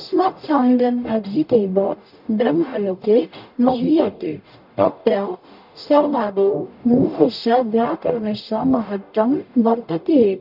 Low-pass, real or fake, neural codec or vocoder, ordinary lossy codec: 5.4 kHz; fake; codec, 44.1 kHz, 0.9 kbps, DAC; none